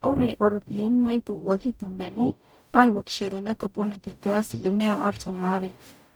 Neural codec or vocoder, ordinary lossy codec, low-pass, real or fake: codec, 44.1 kHz, 0.9 kbps, DAC; none; none; fake